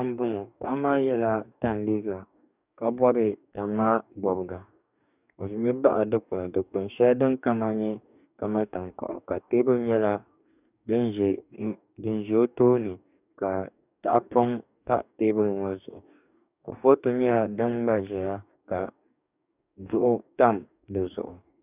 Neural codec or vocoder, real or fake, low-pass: codec, 44.1 kHz, 2.6 kbps, DAC; fake; 3.6 kHz